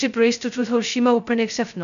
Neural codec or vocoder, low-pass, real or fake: codec, 16 kHz, 0.2 kbps, FocalCodec; 7.2 kHz; fake